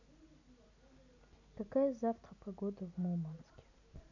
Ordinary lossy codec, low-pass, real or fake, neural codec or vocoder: none; 7.2 kHz; real; none